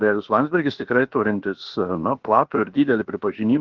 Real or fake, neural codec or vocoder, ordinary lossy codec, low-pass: fake; codec, 16 kHz, about 1 kbps, DyCAST, with the encoder's durations; Opus, 16 kbps; 7.2 kHz